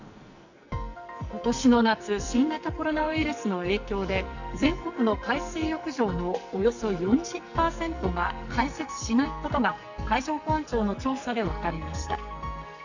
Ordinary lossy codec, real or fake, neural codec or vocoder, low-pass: none; fake; codec, 44.1 kHz, 2.6 kbps, SNAC; 7.2 kHz